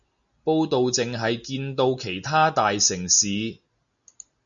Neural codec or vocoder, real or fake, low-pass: none; real; 7.2 kHz